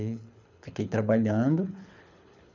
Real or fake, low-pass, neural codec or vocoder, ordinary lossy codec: fake; 7.2 kHz; codec, 24 kHz, 6 kbps, HILCodec; none